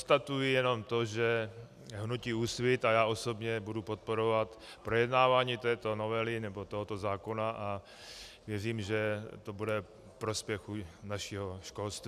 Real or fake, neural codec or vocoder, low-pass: real; none; 14.4 kHz